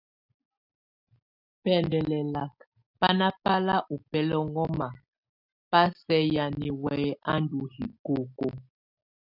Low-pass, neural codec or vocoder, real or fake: 5.4 kHz; none; real